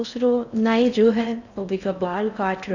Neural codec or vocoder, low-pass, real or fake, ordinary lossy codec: codec, 16 kHz in and 24 kHz out, 0.6 kbps, FocalCodec, streaming, 4096 codes; 7.2 kHz; fake; none